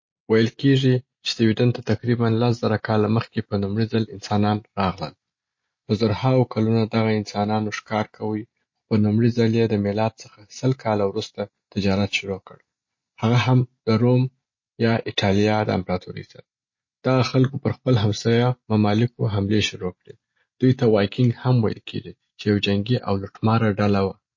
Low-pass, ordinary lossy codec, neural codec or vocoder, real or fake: 7.2 kHz; MP3, 32 kbps; none; real